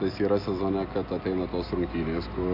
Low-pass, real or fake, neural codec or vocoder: 5.4 kHz; real; none